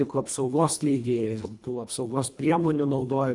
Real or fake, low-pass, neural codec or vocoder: fake; 10.8 kHz; codec, 24 kHz, 1.5 kbps, HILCodec